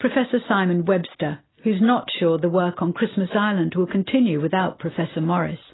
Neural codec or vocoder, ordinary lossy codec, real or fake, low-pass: none; AAC, 16 kbps; real; 7.2 kHz